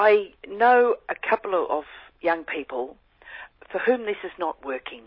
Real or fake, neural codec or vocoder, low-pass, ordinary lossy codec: real; none; 5.4 kHz; MP3, 24 kbps